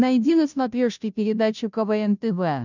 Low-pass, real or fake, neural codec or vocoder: 7.2 kHz; fake; codec, 16 kHz, 0.5 kbps, FunCodec, trained on Chinese and English, 25 frames a second